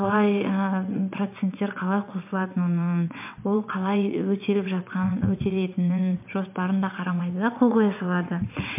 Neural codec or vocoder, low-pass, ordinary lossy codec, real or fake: vocoder, 22.05 kHz, 80 mel bands, WaveNeXt; 3.6 kHz; MP3, 32 kbps; fake